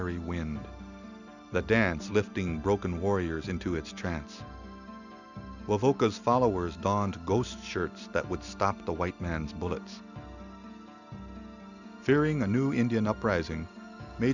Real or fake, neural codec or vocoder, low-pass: real; none; 7.2 kHz